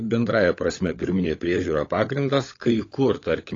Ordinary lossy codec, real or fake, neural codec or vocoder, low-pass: AAC, 32 kbps; fake; codec, 16 kHz, 16 kbps, FunCodec, trained on LibriTTS, 50 frames a second; 7.2 kHz